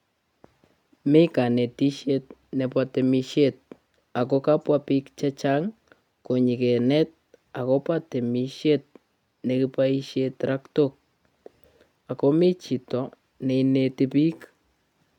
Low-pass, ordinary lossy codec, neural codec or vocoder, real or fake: 19.8 kHz; none; none; real